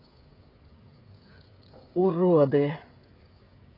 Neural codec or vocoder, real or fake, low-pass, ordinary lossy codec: codec, 16 kHz, 16 kbps, FreqCodec, smaller model; fake; 5.4 kHz; AAC, 24 kbps